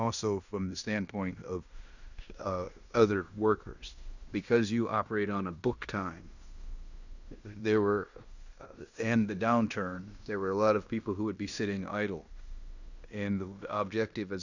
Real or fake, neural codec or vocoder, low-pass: fake; codec, 16 kHz in and 24 kHz out, 0.9 kbps, LongCat-Audio-Codec, fine tuned four codebook decoder; 7.2 kHz